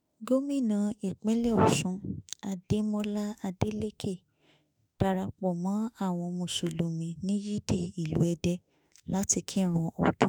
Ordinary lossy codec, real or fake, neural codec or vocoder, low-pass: none; fake; autoencoder, 48 kHz, 32 numbers a frame, DAC-VAE, trained on Japanese speech; none